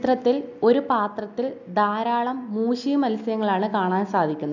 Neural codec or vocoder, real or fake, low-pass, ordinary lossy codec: none; real; 7.2 kHz; none